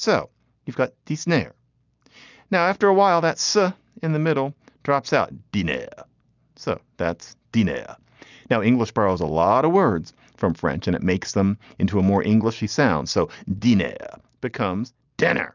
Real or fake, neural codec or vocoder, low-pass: real; none; 7.2 kHz